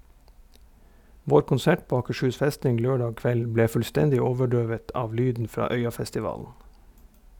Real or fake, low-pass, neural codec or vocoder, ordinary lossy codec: real; 19.8 kHz; none; none